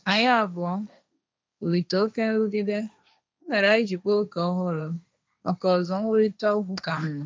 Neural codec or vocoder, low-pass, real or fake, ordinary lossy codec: codec, 16 kHz, 1.1 kbps, Voila-Tokenizer; none; fake; none